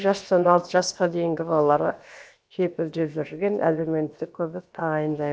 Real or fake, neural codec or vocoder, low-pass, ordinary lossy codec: fake; codec, 16 kHz, 0.7 kbps, FocalCodec; none; none